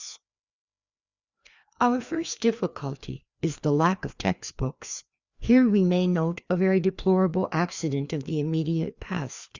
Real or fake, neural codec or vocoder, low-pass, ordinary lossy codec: fake; codec, 16 kHz, 2 kbps, FreqCodec, larger model; 7.2 kHz; Opus, 64 kbps